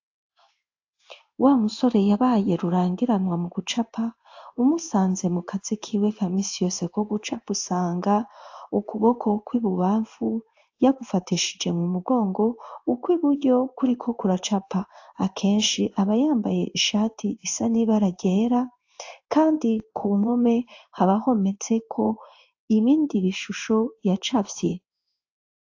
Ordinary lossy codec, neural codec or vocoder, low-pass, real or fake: AAC, 48 kbps; codec, 16 kHz in and 24 kHz out, 1 kbps, XY-Tokenizer; 7.2 kHz; fake